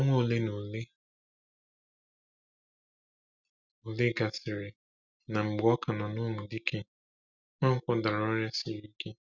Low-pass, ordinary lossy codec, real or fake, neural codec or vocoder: 7.2 kHz; none; real; none